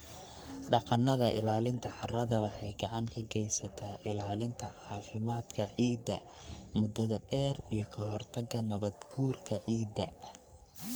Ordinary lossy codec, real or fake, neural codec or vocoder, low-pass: none; fake; codec, 44.1 kHz, 3.4 kbps, Pupu-Codec; none